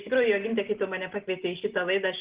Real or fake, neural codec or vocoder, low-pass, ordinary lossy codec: real; none; 3.6 kHz; Opus, 16 kbps